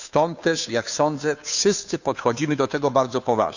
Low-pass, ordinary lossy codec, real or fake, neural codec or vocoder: 7.2 kHz; none; fake; codec, 16 kHz, 2 kbps, FunCodec, trained on Chinese and English, 25 frames a second